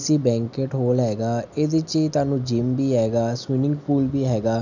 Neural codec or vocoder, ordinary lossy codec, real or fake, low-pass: none; none; real; 7.2 kHz